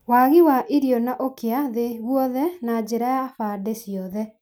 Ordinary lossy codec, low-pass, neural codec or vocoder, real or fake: none; none; none; real